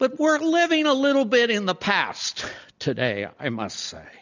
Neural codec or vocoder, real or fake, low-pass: none; real; 7.2 kHz